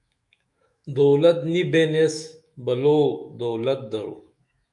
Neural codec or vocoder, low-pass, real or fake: autoencoder, 48 kHz, 128 numbers a frame, DAC-VAE, trained on Japanese speech; 10.8 kHz; fake